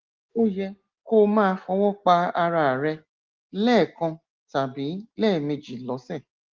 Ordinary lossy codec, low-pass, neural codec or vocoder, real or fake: Opus, 16 kbps; 7.2 kHz; none; real